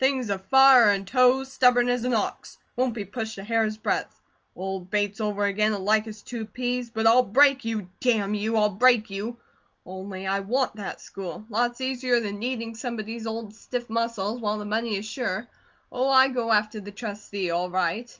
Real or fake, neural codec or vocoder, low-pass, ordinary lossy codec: real; none; 7.2 kHz; Opus, 24 kbps